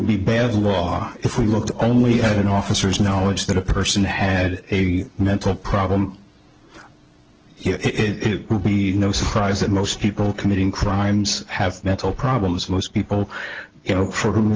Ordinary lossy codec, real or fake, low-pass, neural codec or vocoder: Opus, 16 kbps; real; 7.2 kHz; none